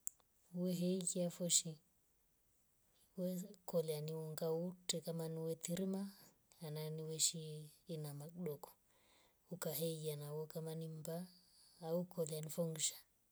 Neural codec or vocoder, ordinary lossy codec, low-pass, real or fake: none; none; none; real